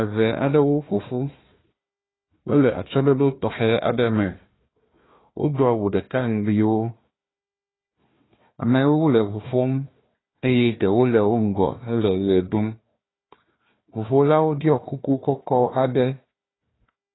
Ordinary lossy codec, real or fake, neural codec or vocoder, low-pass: AAC, 16 kbps; fake; codec, 16 kHz, 1 kbps, FunCodec, trained on Chinese and English, 50 frames a second; 7.2 kHz